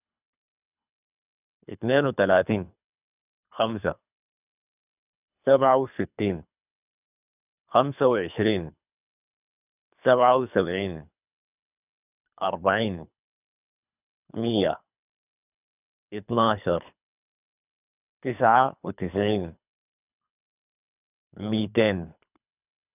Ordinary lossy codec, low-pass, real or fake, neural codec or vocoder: none; 3.6 kHz; fake; codec, 24 kHz, 3 kbps, HILCodec